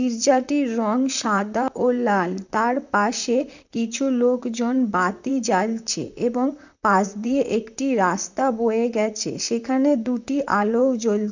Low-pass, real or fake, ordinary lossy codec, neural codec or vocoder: 7.2 kHz; fake; none; vocoder, 44.1 kHz, 128 mel bands, Pupu-Vocoder